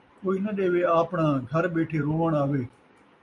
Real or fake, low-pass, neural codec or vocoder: real; 10.8 kHz; none